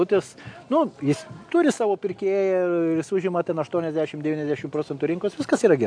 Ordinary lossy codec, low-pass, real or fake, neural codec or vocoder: AAC, 64 kbps; 9.9 kHz; real; none